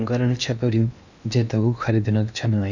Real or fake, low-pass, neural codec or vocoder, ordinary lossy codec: fake; 7.2 kHz; codec, 16 kHz in and 24 kHz out, 0.8 kbps, FocalCodec, streaming, 65536 codes; none